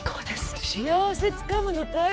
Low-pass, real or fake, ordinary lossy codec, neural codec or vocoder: none; fake; none; codec, 16 kHz, 4 kbps, X-Codec, HuBERT features, trained on general audio